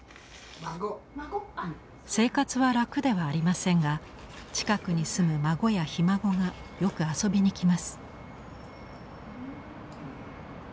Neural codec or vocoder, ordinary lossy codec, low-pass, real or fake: none; none; none; real